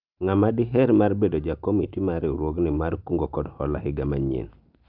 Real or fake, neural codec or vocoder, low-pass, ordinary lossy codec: real; none; 5.4 kHz; Opus, 24 kbps